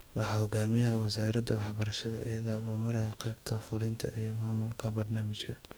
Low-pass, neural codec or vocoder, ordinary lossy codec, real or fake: none; codec, 44.1 kHz, 2.6 kbps, DAC; none; fake